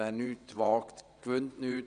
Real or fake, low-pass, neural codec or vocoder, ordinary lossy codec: fake; 9.9 kHz; vocoder, 22.05 kHz, 80 mel bands, WaveNeXt; none